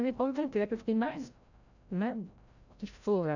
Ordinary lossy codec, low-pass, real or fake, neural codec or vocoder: none; 7.2 kHz; fake; codec, 16 kHz, 0.5 kbps, FreqCodec, larger model